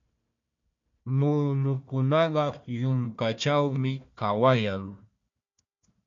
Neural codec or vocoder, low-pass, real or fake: codec, 16 kHz, 1 kbps, FunCodec, trained on Chinese and English, 50 frames a second; 7.2 kHz; fake